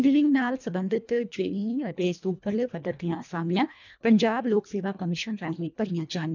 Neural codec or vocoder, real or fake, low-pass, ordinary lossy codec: codec, 24 kHz, 1.5 kbps, HILCodec; fake; 7.2 kHz; none